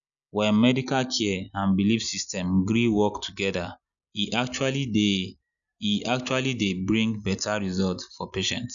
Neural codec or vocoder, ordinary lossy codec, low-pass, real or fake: none; none; 7.2 kHz; real